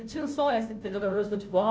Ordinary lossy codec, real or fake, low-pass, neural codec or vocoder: none; fake; none; codec, 16 kHz, 0.5 kbps, FunCodec, trained on Chinese and English, 25 frames a second